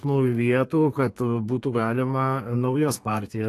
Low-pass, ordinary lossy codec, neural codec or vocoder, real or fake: 14.4 kHz; AAC, 64 kbps; codec, 32 kHz, 1.9 kbps, SNAC; fake